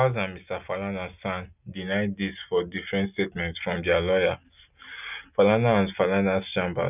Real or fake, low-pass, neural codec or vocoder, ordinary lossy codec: real; 3.6 kHz; none; none